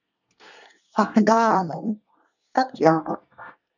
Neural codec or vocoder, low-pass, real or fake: codec, 24 kHz, 1 kbps, SNAC; 7.2 kHz; fake